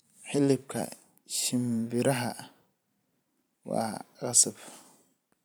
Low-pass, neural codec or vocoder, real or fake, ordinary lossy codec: none; vocoder, 44.1 kHz, 128 mel bands every 512 samples, BigVGAN v2; fake; none